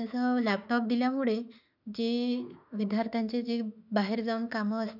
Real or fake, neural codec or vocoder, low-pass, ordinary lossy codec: fake; autoencoder, 48 kHz, 32 numbers a frame, DAC-VAE, trained on Japanese speech; 5.4 kHz; none